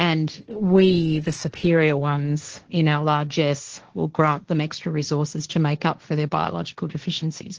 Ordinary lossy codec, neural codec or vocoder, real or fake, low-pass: Opus, 16 kbps; codec, 16 kHz, 1.1 kbps, Voila-Tokenizer; fake; 7.2 kHz